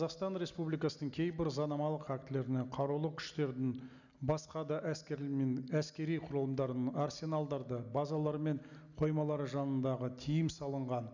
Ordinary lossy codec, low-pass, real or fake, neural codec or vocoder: none; 7.2 kHz; real; none